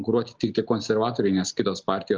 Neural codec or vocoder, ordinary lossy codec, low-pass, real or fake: none; Opus, 32 kbps; 7.2 kHz; real